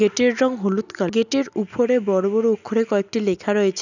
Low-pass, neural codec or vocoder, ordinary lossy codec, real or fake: 7.2 kHz; none; none; real